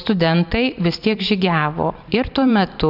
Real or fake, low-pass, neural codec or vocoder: fake; 5.4 kHz; vocoder, 44.1 kHz, 128 mel bands every 512 samples, BigVGAN v2